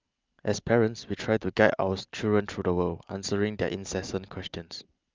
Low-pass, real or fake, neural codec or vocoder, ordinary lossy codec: 7.2 kHz; real; none; Opus, 24 kbps